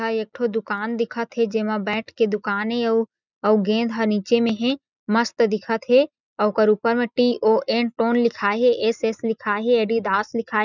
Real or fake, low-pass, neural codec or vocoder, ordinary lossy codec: real; 7.2 kHz; none; none